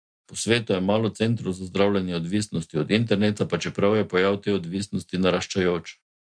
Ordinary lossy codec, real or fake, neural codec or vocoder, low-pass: MP3, 64 kbps; real; none; 9.9 kHz